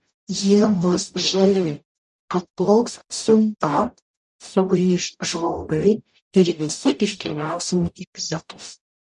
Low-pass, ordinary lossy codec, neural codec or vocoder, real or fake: 10.8 kHz; MP3, 96 kbps; codec, 44.1 kHz, 0.9 kbps, DAC; fake